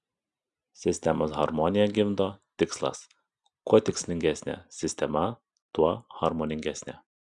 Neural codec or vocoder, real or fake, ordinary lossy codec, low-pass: none; real; Opus, 64 kbps; 10.8 kHz